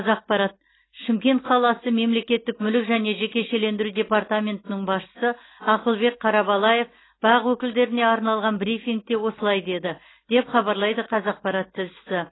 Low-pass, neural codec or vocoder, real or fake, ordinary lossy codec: 7.2 kHz; none; real; AAC, 16 kbps